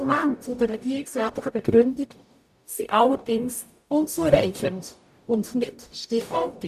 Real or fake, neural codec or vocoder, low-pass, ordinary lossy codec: fake; codec, 44.1 kHz, 0.9 kbps, DAC; 14.4 kHz; none